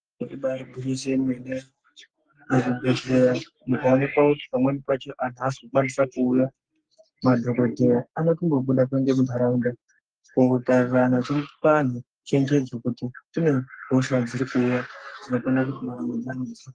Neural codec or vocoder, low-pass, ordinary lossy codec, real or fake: codec, 44.1 kHz, 3.4 kbps, Pupu-Codec; 9.9 kHz; Opus, 24 kbps; fake